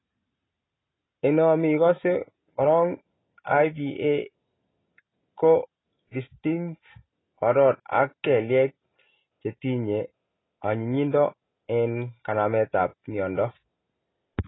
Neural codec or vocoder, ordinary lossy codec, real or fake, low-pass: none; AAC, 16 kbps; real; 7.2 kHz